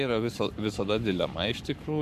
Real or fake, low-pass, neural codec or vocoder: fake; 14.4 kHz; codec, 44.1 kHz, 7.8 kbps, Pupu-Codec